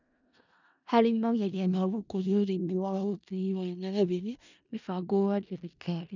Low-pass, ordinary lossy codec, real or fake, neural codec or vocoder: 7.2 kHz; none; fake; codec, 16 kHz in and 24 kHz out, 0.4 kbps, LongCat-Audio-Codec, four codebook decoder